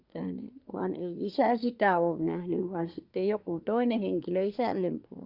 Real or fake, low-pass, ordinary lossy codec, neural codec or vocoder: fake; 5.4 kHz; Opus, 64 kbps; codec, 24 kHz, 1 kbps, SNAC